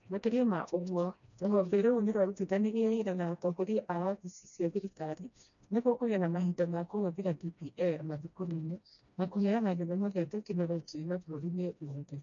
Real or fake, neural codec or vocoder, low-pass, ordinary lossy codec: fake; codec, 16 kHz, 1 kbps, FreqCodec, smaller model; 7.2 kHz; none